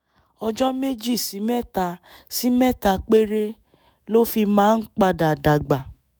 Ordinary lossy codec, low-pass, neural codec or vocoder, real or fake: none; none; autoencoder, 48 kHz, 128 numbers a frame, DAC-VAE, trained on Japanese speech; fake